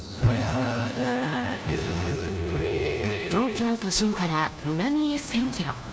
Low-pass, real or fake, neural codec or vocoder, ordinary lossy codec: none; fake; codec, 16 kHz, 1 kbps, FunCodec, trained on LibriTTS, 50 frames a second; none